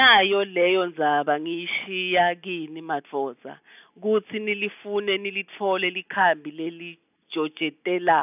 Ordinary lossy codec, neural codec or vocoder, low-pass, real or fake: none; none; 3.6 kHz; real